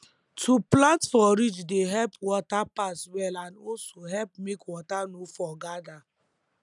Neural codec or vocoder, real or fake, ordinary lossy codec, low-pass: none; real; none; 10.8 kHz